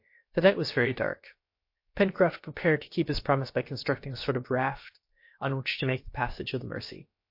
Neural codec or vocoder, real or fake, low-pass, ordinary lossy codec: codec, 16 kHz, about 1 kbps, DyCAST, with the encoder's durations; fake; 5.4 kHz; MP3, 32 kbps